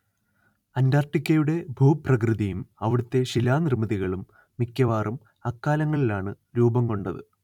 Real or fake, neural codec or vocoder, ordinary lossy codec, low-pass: fake; vocoder, 44.1 kHz, 128 mel bands every 256 samples, BigVGAN v2; none; 19.8 kHz